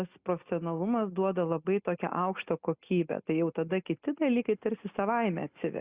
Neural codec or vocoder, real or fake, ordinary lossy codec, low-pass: none; real; Opus, 64 kbps; 3.6 kHz